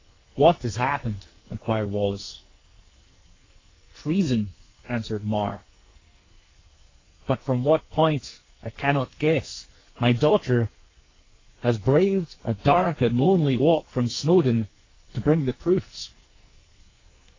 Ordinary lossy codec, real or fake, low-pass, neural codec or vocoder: AAC, 32 kbps; fake; 7.2 kHz; codec, 44.1 kHz, 2.6 kbps, SNAC